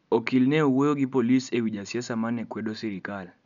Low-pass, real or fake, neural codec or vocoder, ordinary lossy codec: 7.2 kHz; real; none; none